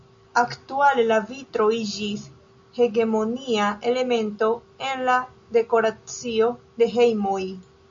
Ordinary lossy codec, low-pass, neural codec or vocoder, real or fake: MP3, 48 kbps; 7.2 kHz; none; real